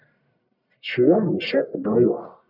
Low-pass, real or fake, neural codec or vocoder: 5.4 kHz; fake; codec, 44.1 kHz, 1.7 kbps, Pupu-Codec